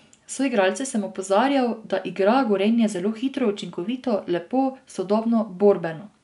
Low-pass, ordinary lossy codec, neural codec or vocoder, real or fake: 10.8 kHz; none; none; real